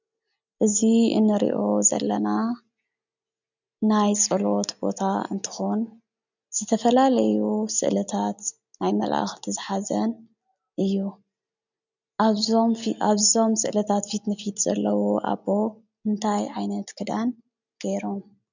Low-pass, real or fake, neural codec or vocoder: 7.2 kHz; real; none